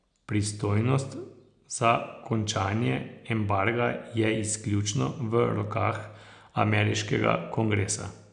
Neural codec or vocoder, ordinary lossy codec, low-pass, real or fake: none; none; 9.9 kHz; real